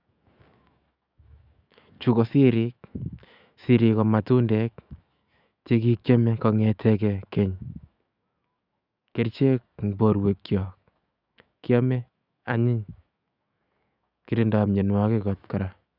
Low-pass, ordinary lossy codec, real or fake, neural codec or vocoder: 5.4 kHz; Opus, 64 kbps; fake; autoencoder, 48 kHz, 128 numbers a frame, DAC-VAE, trained on Japanese speech